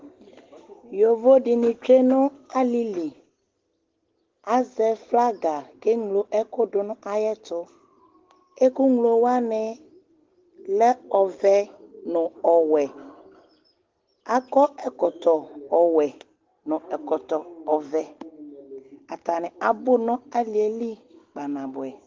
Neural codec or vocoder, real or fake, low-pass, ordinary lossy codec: none; real; 7.2 kHz; Opus, 16 kbps